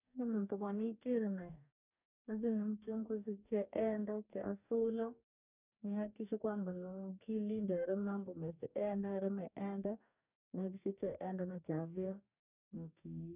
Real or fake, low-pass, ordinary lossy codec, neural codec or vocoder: fake; 3.6 kHz; none; codec, 44.1 kHz, 2.6 kbps, DAC